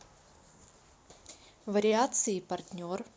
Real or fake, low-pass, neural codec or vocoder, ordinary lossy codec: real; none; none; none